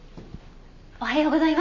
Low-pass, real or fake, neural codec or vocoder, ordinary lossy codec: 7.2 kHz; real; none; MP3, 64 kbps